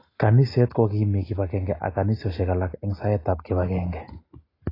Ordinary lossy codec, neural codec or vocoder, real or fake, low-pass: AAC, 24 kbps; none; real; 5.4 kHz